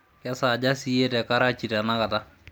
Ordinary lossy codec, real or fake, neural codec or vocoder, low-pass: none; real; none; none